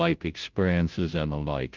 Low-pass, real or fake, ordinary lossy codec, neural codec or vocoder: 7.2 kHz; fake; Opus, 32 kbps; codec, 16 kHz, 0.5 kbps, FunCodec, trained on Chinese and English, 25 frames a second